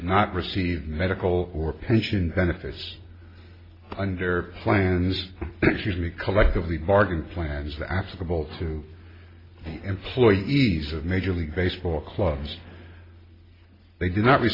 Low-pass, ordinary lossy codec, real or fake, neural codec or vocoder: 5.4 kHz; AAC, 24 kbps; real; none